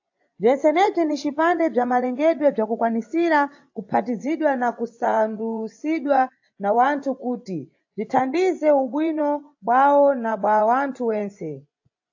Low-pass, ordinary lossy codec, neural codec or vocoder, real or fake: 7.2 kHz; AAC, 48 kbps; vocoder, 24 kHz, 100 mel bands, Vocos; fake